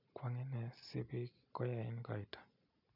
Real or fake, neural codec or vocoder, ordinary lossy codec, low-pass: real; none; none; 5.4 kHz